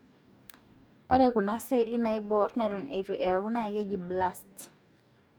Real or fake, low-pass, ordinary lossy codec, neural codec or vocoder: fake; none; none; codec, 44.1 kHz, 2.6 kbps, DAC